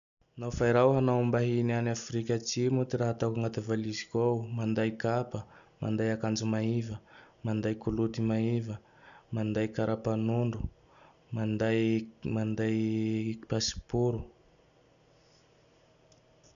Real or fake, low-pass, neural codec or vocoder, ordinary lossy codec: real; 7.2 kHz; none; none